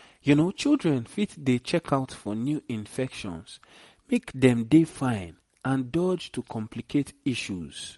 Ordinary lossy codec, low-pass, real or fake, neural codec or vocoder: MP3, 48 kbps; 19.8 kHz; real; none